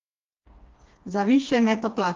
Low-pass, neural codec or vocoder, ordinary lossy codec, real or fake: 7.2 kHz; codec, 16 kHz, 2 kbps, FreqCodec, smaller model; Opus, 32 kbps; fake